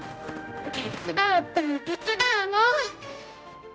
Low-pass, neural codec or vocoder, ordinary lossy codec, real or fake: none; codec, 16 kHz, 0.5 kbps, X-Codec, HuBERT features, trained on general audio; none; fake